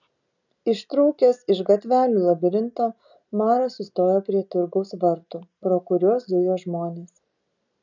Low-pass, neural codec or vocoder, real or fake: 7.2 kHz; none; real